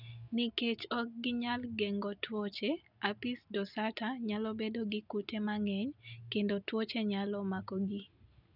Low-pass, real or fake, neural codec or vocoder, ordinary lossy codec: 5.4 kHz; real; none; none